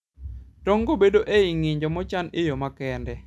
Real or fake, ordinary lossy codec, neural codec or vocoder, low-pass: real; none; none; none